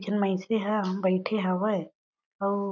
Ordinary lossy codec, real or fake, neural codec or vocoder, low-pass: none; real; none; 7.2 kHz